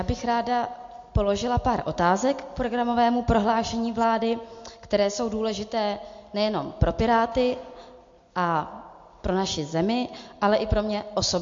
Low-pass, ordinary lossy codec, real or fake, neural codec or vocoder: 7.2 kHz; MP3, 48 kbps; real; none